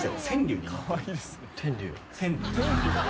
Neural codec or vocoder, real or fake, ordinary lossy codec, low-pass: none; real; none; none